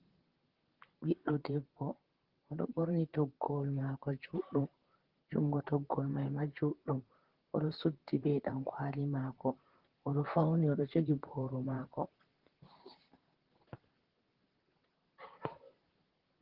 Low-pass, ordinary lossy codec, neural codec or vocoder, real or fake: 5.4 kHz; Opus, 16 kbps; vocoder, 44.1 kHz, 128 mel bands, Pupu-Vocoder; fake